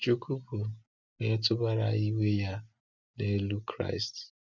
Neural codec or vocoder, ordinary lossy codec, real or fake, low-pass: none; none; real; 7.2 kHz